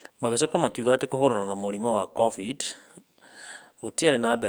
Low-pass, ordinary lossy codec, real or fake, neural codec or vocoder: none; none; fake; codec, 44.1 kHz, 2.6 kbps, SNAC